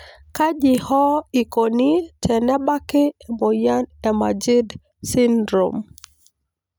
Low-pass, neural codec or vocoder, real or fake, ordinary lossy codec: none; none; real; none